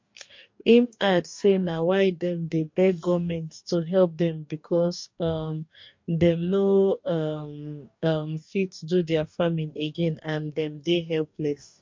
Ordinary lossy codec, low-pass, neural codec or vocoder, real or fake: MP3, 48 kbps; 7.2 kHz; codec, 44.1 kHz, 2.6 kbps, DAC; fake